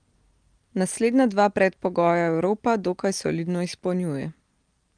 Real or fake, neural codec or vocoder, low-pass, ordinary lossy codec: real; none; 9.9 kHz; Opus, 24 kbps